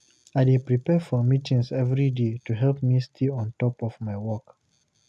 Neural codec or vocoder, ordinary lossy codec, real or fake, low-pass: none; none; real; none